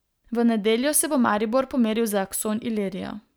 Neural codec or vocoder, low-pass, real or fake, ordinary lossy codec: none; none; real; none